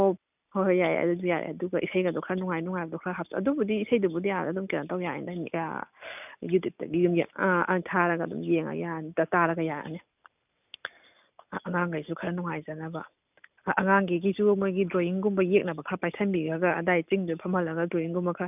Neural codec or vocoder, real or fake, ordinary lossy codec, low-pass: none; real; none; 3.6 kHz